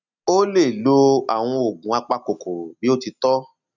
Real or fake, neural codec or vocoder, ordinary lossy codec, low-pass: real; none; none; 7.2 kHz